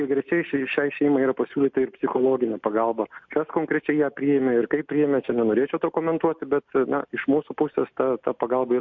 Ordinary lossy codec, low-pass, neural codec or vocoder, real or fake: MP3, 48 kbps; 7.2 kHz; none; real